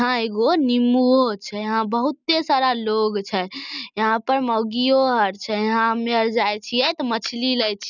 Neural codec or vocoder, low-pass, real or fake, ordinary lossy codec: none; 7.2 kHz; real; none